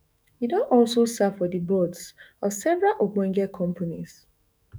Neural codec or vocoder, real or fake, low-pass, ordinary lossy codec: autoencoder, 48 kHz, 128 numbers a frame, DAC-VAE, trained on Japanese speech; fake; none; none